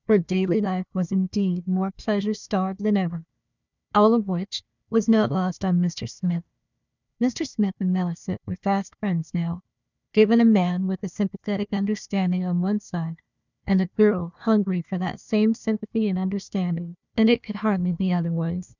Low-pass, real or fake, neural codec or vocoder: 7.2 kHz; fake; codec, 16 kHz, 1 kbps, FunCodec, trained on Chinese and English, 50 frames a second